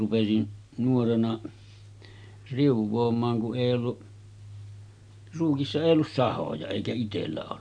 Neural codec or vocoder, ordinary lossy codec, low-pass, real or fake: none; none; 9.9 kHz; real